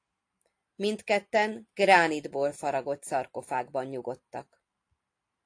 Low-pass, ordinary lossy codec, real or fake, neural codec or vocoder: 9.9 kHz; AAC, 48 kbps; real; none